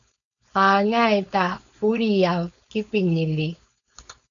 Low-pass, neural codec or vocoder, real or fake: 7.2 kHz; codec, 16 kHz, 4.8 kbps, FACodec; fake